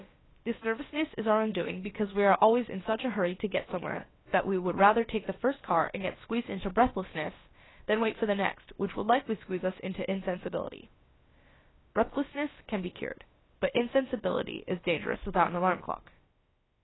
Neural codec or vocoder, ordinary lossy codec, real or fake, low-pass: codec, 16 kHz, about 1 kbps, DyCAST, with the encoder's durations; AAC, 16 kbps; fake; 7.2 kHz